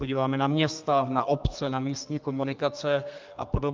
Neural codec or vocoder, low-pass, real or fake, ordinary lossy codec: codec, 32 kHz, 1.9 kbps, SNAC; 7.2 kHz; fake; Opus, 24 kbps